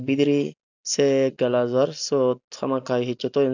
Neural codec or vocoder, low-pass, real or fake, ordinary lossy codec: none; 7.2 kHz; real; none